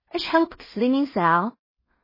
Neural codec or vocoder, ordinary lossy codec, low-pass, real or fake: codec, 16 kHz in and 24 kHz out, 0.4 kbps, LongCat-Audio-Codec, two codebook decoder; MP3, 24 kbps; 5.4 kHz; fake